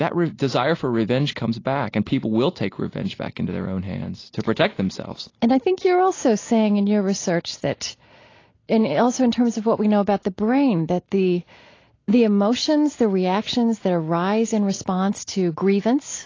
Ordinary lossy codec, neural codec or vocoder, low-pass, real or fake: AAC, 32 kbps; none; 7.2 kHz; real